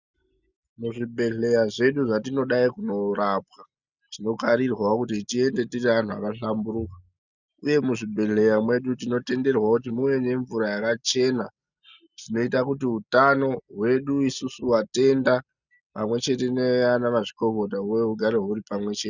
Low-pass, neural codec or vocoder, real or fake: 7.2 kHz; none; real